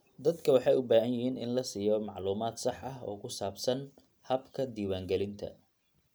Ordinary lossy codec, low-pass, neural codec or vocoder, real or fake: none; none; none; real